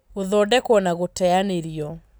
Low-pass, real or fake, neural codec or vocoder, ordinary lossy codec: none; real; none; none